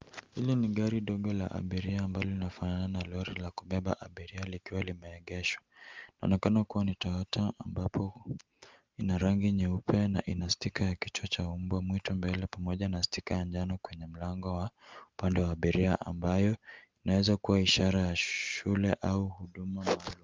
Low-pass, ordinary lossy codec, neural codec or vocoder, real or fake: 7.2 kHz; Opus, 32 kbps; none; real